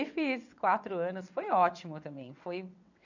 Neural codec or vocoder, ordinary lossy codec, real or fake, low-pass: vocoder, 22.05 kHz, 80 mel bands, WaveNeXt; none; fake; 7.2 kHz